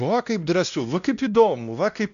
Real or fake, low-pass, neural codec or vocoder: fake; 7.2 kHz; codec, 16 kHz, 1 kbps, X-Codec, WavLM features, trained on Multilingual LibriSpeech